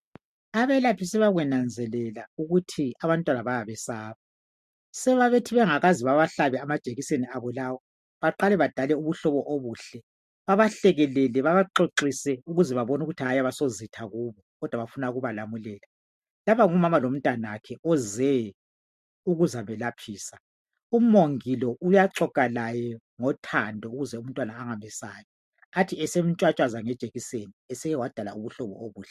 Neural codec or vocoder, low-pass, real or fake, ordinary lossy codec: none; 14.4 kHz; real; MP3, 64 kbps